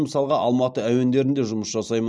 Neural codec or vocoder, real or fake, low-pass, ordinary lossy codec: none; real; none; none